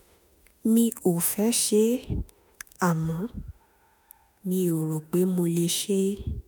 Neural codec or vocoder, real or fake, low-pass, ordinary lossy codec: autoencoder, 48 kHz, 32 numbers a frame, DAC-VAE, trained on Japanese speech; fake; none; none